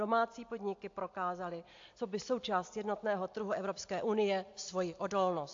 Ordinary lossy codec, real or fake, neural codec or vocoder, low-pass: AAC, 48 kbps; real; none; 7.2 kHz